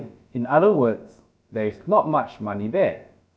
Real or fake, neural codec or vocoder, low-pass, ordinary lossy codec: fake; codec, 16 kHz, about 1 kbps, DyCAST, with the encoder's durations; none; none